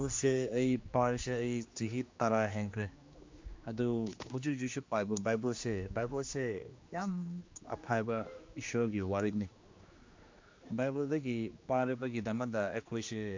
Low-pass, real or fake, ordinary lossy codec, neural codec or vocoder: 7.2 kHz; fake; MP3, 48 kbps; codec, 16 kHz, 2 kbps, X-Codec, HuBERT features, trained on general audio